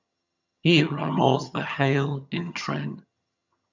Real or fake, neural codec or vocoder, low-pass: fake; vocoder, 22.05 kHz, 80 mel bands, HiFi-GAN; 7.2 kHz